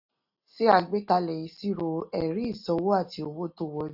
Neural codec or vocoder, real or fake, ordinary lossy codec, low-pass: none; real; AAC, 48 kbps; 5.4 kHz